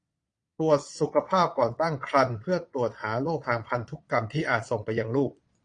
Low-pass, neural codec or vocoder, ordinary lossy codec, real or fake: 9.9 kHz; vocoder, 22.05 kHz, 80 mel bands, Vocos; AAC, 48 kbps; fake